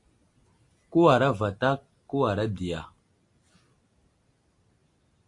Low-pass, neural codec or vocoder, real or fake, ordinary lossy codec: 10.8 kHz; none; real; AAC, 48 kbps